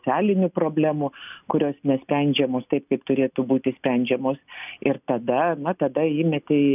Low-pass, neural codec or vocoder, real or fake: 3.6 kHz; none; real